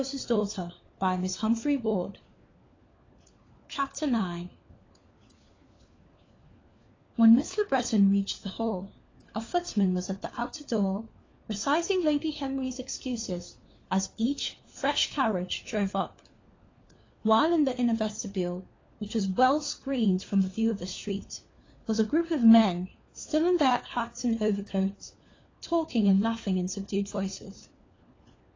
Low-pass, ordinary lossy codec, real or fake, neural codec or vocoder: 7.2 kHz; AAC, 32 kbps; fake; codec, 16 kHz, 4 kbps, FunCodec, trained on LibriTTS, 50 frames a second